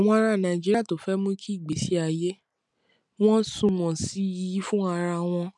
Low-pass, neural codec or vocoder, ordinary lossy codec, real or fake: 10.8 kHz; none; MP3, 96 kbps; real